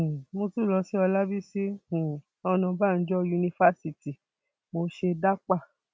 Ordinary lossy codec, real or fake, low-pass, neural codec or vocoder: none; real; none; none